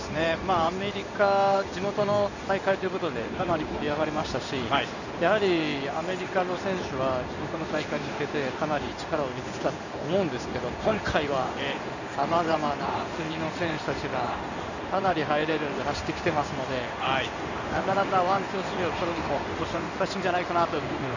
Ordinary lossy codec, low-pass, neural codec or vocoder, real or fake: none; 7.2 kHz; codec, 16 kHz in and 24 kHz out, 1 kbps, XY-Tokenizer; fake